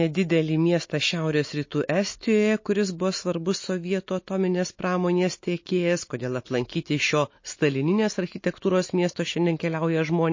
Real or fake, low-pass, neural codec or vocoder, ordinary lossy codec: real; 7.2 kHz; none; MP3, 32 kbps